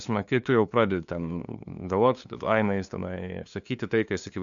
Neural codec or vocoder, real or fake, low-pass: codec, 16 kHz, 2 kbps, FunCodec, trained on LibriTTS, 25 frames a second; fake; 7.2 kHz